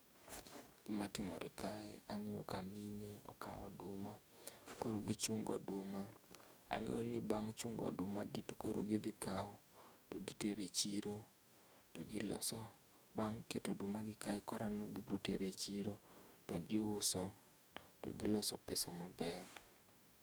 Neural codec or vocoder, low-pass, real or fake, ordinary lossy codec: codec, 44.1 kHz, 2.6 kbps, DAC; none; fake; none